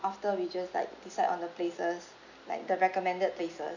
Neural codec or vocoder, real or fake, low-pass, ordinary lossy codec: none; real; 7.2 kHz; none